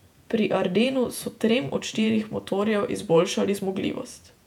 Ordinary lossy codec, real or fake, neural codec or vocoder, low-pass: none; fake; vocoder, 48 kHz, 128 mel bands, Vocos; 19.8 kHz